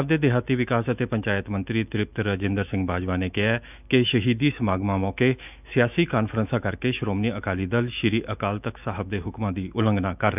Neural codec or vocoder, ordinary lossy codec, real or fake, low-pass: autoencoder, 48 kHz, 128 numbers a frame, DAC-VAE, trained on Japanese speech; none; fake; 3.6 kHz